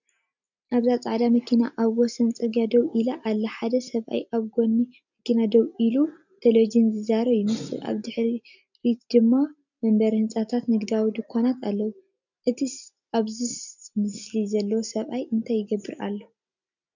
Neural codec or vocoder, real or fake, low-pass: none; real; 7.2 kHz